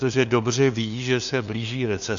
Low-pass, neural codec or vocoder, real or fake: 7.2 kHz; codec, 16 kHz, 2 kbps, FunCodec, trained on LibriTTS, 25 frames a second; fake